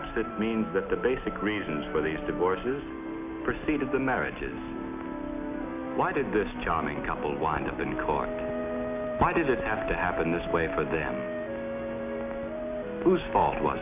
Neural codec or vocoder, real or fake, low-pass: none; real; 3.6 kHz